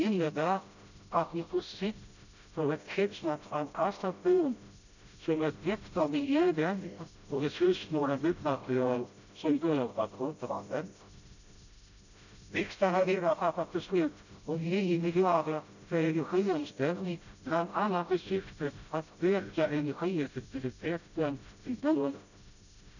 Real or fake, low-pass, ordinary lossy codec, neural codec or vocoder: fake; 7.2 kHz; none; codec, 16 kHz, 0.5 kbps, FreqCodec, smaller model